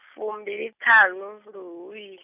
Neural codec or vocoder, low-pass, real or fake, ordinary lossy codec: none; 3.6 kHz; real; none